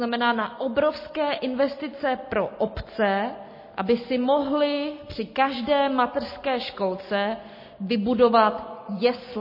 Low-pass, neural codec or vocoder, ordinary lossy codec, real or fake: 5.4 kHz; none; MP3, 24 kbps; real